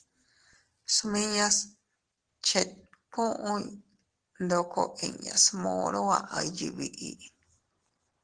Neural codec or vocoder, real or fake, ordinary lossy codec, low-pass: none; real; Opus, 16 kbps; 9.9 kHz